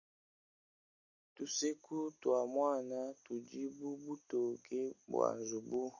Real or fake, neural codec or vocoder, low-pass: real; none; 7.2 kHz